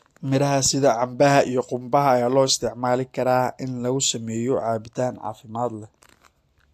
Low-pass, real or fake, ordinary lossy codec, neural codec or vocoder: 14.4 kHz; real; AAC, 64 kbps; none